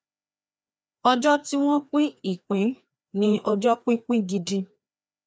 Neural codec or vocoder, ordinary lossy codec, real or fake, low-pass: codec, 16 kHz, 2 kbps, FreqCodec, larger model; none; fake; none